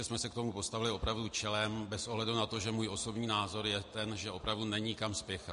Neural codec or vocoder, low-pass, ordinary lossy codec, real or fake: none; 10.8 kHz; MP3, 48 kbps; real